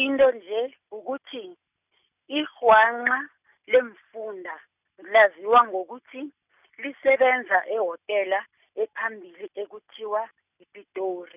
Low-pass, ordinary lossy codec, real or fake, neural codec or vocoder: 3.6 kHz; none; real; none